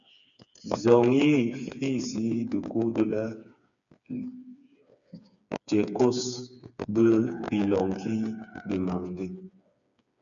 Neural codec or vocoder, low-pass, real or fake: codec, 16 kHz, 4 kbps, FreqCodec, smaller model; 7.2 kHz; fake